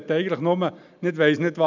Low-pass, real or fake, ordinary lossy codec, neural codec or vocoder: 7.2 kHz; real; none; none